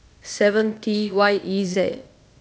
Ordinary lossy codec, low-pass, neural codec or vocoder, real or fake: none; none; codec, 16 kHz, 0.8 kbps, ZipCodec; fake